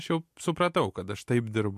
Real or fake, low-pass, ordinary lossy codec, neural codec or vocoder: real; 14.4 kHz; MP3, 64 kbps; none